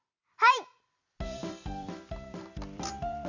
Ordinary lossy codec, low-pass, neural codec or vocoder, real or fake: Opus, 64 kbps; 7.2 kHz; vocoder, 44.1 kHz, 128 mel bands every 256 samples, BigVGAN v2; fake